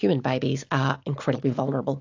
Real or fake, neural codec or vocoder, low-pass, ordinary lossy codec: real; none; 7.2 kHz; AAC, 48 kbps